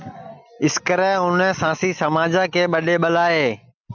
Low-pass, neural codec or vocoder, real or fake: 7.2 kHz; none; real